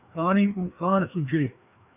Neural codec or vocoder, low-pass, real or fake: codec, 16 kHz, 2 kbps, FreqCodec, larger model; 3.6 kHz; fake